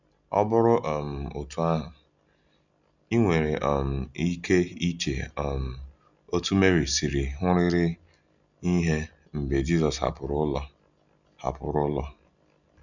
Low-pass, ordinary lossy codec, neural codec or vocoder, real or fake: 7.2 kHz; none; none; real